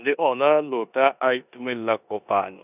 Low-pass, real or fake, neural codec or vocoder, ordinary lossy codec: 3.6 kHz; fake; codec, 16 kHz in and 24 kHz out, 0.9 kbps, LongCat-Audio-Codec, four codebook decoder; none